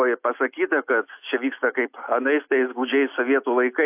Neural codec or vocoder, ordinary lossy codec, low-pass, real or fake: none; AAC, 32 kbps; 3.6 kHz; real